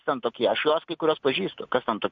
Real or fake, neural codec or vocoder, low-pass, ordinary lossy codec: real; none; 7.2 kHz; MP3, 48 kbps